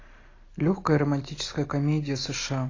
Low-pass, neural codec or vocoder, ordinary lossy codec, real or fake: 7.2 kHz; none; AAC, 32 kbps; real